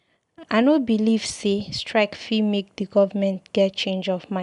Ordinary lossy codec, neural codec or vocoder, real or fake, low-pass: none; none; real; 10.8 kHz